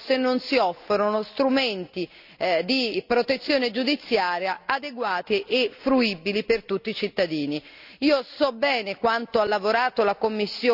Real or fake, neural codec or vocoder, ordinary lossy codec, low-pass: real; none; none; 5.4 kHz